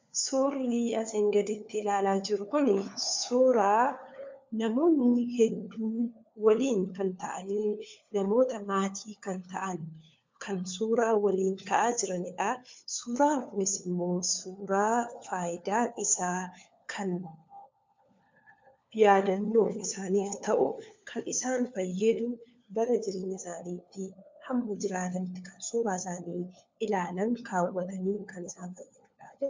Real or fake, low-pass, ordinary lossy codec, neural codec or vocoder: fake; 7.2 kHz; MP3, 64 kbps; codec, 16 kHz, 4 kbps, FunCodec, trained on LibriTTS, 50 frames a second